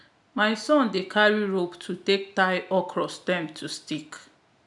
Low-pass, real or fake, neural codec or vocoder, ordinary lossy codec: 10.8 kHz; real; none; none